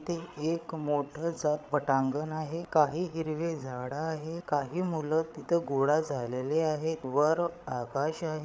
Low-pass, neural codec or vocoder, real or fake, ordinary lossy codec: none; codec, 16 kHz, 8 kbps, FreqCodec, larger model; fake; none